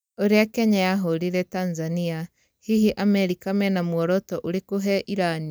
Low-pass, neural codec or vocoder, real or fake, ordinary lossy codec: none; none; real; none